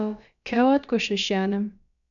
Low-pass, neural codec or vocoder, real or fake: 7.2 kHz; codec, 16 kHz, about 1 kbps, DyCAST, with the encoder's durations; fake